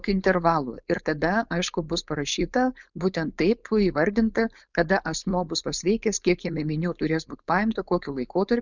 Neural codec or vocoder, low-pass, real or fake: codec, 16 kHz, 4.8 kbps, FACodec; 7.2 kHz; fake